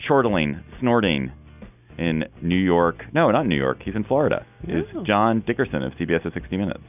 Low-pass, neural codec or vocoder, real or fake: 3.6 kHz; none; real